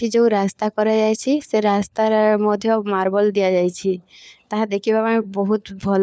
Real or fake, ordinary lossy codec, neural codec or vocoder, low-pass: fake; none; codec, 16 kHz, 4 kbps, FunCodec, trained on LibriTTS, 50 frames a second; none